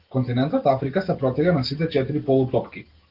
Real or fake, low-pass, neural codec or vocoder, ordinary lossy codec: real; 5.4 kHz; none; Opus, 16 kbps